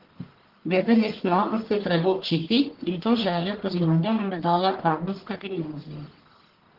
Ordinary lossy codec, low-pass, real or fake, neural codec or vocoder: Opus, 16 kbps; 5.4 kHz; fake; codec, 44.1 kHz, 1.7 kbps, Pupu-Codec